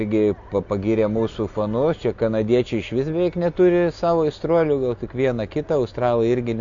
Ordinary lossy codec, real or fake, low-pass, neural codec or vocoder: MP3, 64 kbps; real; 7.2 kHz; none